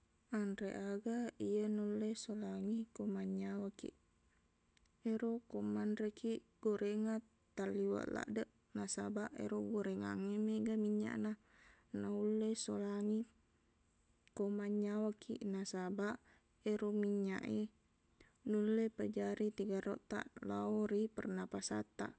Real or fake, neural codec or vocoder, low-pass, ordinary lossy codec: real; none; none; none